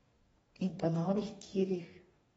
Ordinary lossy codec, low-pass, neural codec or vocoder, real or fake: AAC, 24 kbps; 19.8 kHz; codec, 44.1 kHz, 2.6 kbps, DAC; fake